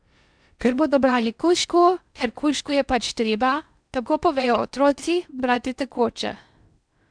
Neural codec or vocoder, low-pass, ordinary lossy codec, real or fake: codec, 16 kHz in and 24 kHz out, 0.6 kbps, FocalCodec, streaming, 4096 codes; 9.9 kHz; none; fake